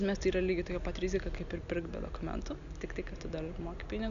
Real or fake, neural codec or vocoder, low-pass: real; none; 7.2 kHz